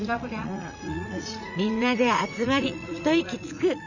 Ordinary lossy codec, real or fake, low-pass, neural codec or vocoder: none; fake; 7.2 kHz; vocoder, 44.1 kHz, 80 mel bands, Vocos